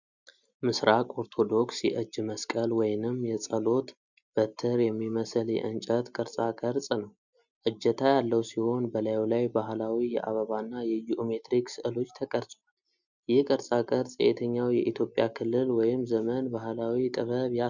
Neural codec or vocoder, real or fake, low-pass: none; real; 7.2 kHz